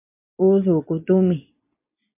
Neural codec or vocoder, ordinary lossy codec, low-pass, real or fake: none; MP3, 32 kbps; 3.6 kHz; real